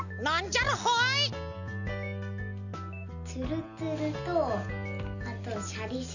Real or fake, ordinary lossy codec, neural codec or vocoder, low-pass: real; none; none; 7.2 kHz